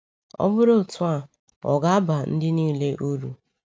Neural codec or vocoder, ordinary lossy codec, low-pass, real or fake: none; none; none; real